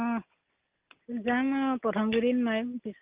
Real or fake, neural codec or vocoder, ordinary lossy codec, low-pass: real; none; Opus, 24 kbps; 3.6 kHz